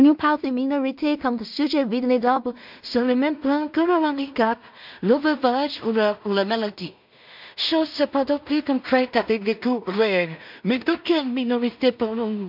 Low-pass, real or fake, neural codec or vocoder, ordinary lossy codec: 5.4 kHz; fake; codec, 16 kHz in and 24 kHz out, 0.4 kbps, LongCat-Audio-Codec, two codebook decoder; MP3, 48 kbps